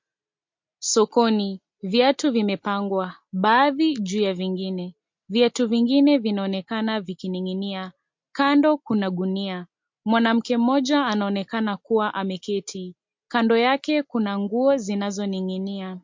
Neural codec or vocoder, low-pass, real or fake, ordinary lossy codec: none; 7.2 kHz; real; MP3, 48 kbps